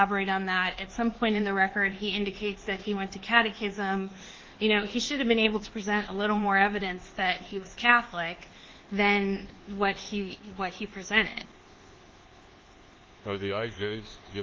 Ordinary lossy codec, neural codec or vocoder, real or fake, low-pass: Opus, 16 kbps; codec, 24 kHz, 1.2 kbps, DualCodec; fake; 7.2 kHz